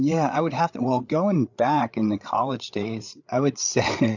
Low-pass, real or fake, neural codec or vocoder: 7.2 kHz; fake; codec, 16 kHz, 8 kbps, FreqCodec, larger model